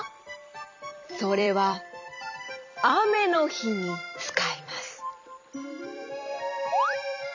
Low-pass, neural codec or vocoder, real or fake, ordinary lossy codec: 7.2 kHz; vocoder, 44.1 kHz, 128 mel bands every 256 samples, BigVGAN v2; fake; MP3, 64 kbps